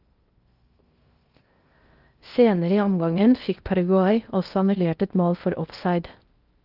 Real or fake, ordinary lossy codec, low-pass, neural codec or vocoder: fake; Opus, 32 kbps; 5.4 kHz; codec, 16 kHz in and 24 kHz out, 0.6 kbps, FocalCodec, streaming, 2048 codes